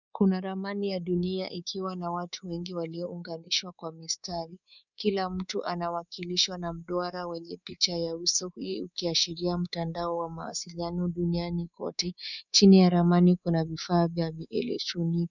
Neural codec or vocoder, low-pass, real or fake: codec, 24 kHz, 3.1 kbps, DualCodec; 7.2 kHz; fake